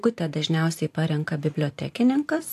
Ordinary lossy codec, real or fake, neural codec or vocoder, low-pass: AAC, 64 kbps; fake; vocoder, 44.1 kHz, 128 mel bands every 512 samples, BigVGAN v2; 14.4 kHz